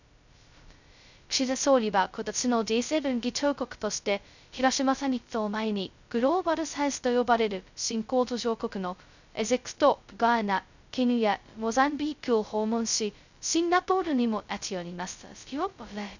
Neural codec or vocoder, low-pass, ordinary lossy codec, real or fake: codec, 16 kHz, 0.2 kbps, FocalCodec; 7.2 kHz; none; fake